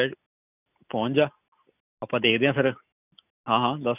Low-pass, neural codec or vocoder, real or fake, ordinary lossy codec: 3.6 kHz; none; real; none